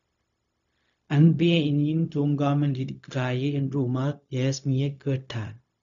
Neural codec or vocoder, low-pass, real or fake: codec, 16 kHz, 0.4 kbps, LongCat-Audio-Codec; 7.2 kHz; fake